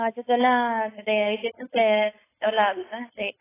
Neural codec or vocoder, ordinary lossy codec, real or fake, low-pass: codec, 16 kHz, 4.8 kbps, FACodec; AAC, 16 kbps; fake; 3.6 kHz